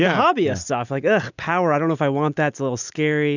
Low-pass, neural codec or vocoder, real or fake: 7.2 kHz; none; real